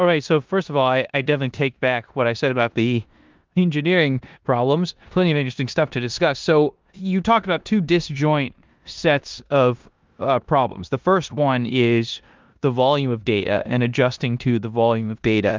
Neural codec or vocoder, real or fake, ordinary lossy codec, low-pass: codec, 16 kHz in and 24 kHz out, 0.9 kbps, LongCat-Audio-Codec, four codebook decoder; fake; Opus, 24 kbps; 7.2 kHz